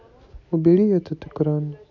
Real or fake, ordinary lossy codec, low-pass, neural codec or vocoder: real; none; 7.2 kHz; none